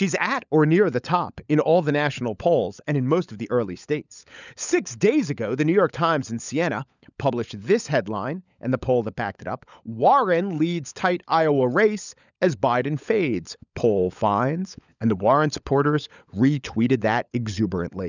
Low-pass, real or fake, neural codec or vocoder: 7.2 kHz; fake; codec, 16 kHz, 16 kbps, FunCodec, trained on LibriTTS, 50 frames a second